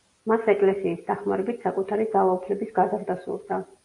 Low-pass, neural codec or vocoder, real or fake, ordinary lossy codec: 10.8 kHz; none; real; AAC, 48 kbps